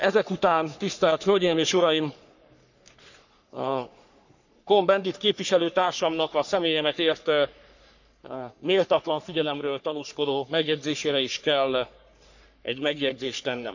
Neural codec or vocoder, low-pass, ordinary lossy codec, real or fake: codec, 44.1 kHz, 3.4 kbps, Pupu-Codec; 7.2 kHz; none; fake